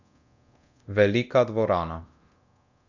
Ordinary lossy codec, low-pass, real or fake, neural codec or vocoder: none; 7.2 kHz; fake; codec, 24 kHz, 0.9 kbps, DualCodec